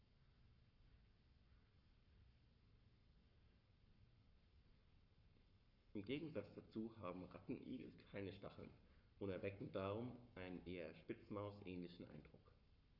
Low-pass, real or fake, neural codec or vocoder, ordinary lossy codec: 5.4 kHz; fake; codec, 44.1 kHz, 7.8 kbps, Pupu-Codec; none